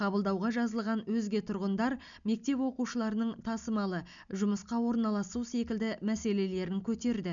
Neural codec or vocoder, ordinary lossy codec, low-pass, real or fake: none; none; 7.2 kHz; real